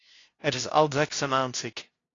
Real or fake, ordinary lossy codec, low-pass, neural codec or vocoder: fake; AAC, 32 kbps; 7.2 kHz; codec, 16 kHz, 0.5 kbps, FunCodec, trained on LibriTTS, 25 frames a second